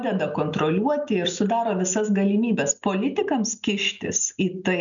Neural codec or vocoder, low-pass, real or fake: none; 7.2 kHz; real